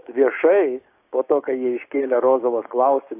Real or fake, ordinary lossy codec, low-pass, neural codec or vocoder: fake; AAC, 24 kbps; 3.6 kHz; codec, 16 kHz, 6 kbps, DAC